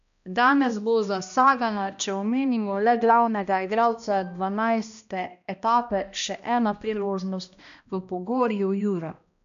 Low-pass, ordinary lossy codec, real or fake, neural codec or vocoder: 7.2 kHz; none; fake; codec, 16 kHz, 1 kbps, X-Codec, HuBERT features, trained on balanced general audio